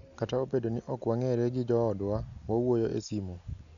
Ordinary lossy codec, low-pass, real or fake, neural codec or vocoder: none; 7.2 kHz; real; none